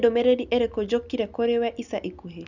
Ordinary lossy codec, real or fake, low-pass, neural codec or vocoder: none; real; 7.2 kHz; none